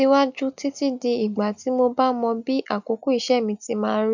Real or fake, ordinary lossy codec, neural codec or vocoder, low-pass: real; none; none; 7.2 kHz